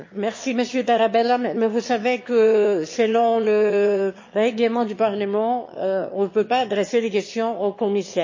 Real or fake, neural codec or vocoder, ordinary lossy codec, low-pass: fake; autoencoder, 22.05 kHz, a latent of 192 numbers a frame, VITS, trained on one speaker; MP3, 32 kbps; 7.2 kHz